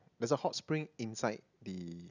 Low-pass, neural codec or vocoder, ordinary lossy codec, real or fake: 7.2 kHz; none; none; real